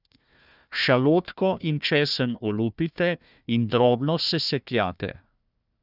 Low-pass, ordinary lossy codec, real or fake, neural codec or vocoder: 5.4 kHz; none; fake; codec, 16 kHz, 1 kbps, FunCodec, trained on Chinese and English, 50 frames a second